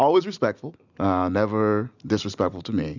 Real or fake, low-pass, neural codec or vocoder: real; 7.2 kHz; none